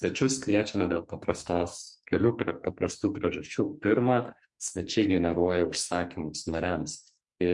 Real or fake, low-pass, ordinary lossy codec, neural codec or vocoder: fake; 10.8 kHz; MP3, 64 kbps; codec, 44.1 kHz, 2.6 kbps, SNAC